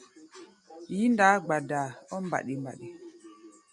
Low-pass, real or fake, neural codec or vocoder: 10.8 kHz; real; none